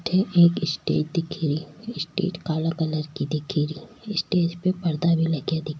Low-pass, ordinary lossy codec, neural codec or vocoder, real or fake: none; none; none; real